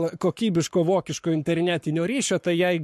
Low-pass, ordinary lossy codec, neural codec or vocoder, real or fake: 14.4 kHz; MP3, 64 kbps; codec, 44.1 kHz, 7.8 kbps, Pupu-Codec; fake